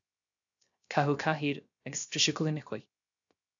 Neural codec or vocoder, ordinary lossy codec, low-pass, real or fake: codec, 16 kHz, 0.3 kbps, FocalCodec; AAC, 64 kbps; 7.2 kHz; fake